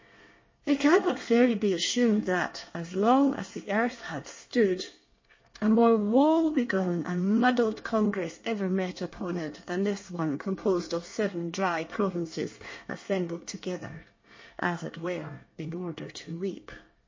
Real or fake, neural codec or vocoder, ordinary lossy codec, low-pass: fake; codec, 24 kHz, 1 kbps, SNAC; MP3, 32 kbps; 7.2 kHz